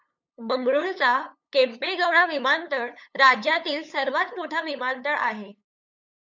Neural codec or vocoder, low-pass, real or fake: codec, 16 kHz, 8 kbps, FunCodec, trained on LibriTTS, 25 frames a second; 7.2 kHz; fake